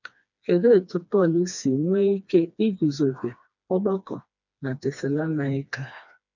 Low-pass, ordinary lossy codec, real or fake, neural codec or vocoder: 7.2 kHz; none; fake; codec, 16 kHz, 2 kbps, FreqCodec, smaller model